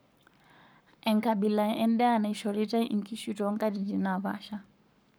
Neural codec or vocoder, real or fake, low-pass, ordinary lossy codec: codec, 44.1 kHz, 7.8 kbps, Pupu-Codec; fake; none; none